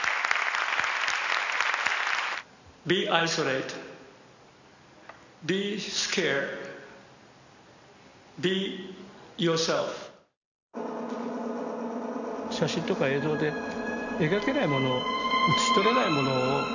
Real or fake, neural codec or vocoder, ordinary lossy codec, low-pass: real; none; none; 7.2 kHz